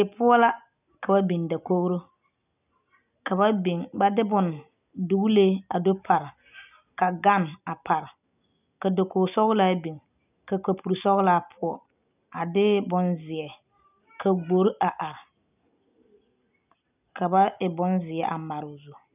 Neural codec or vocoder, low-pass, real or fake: none; 3.6 kHz; real